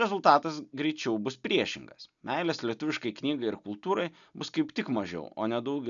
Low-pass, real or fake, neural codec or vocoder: 7.2 kHz; real; none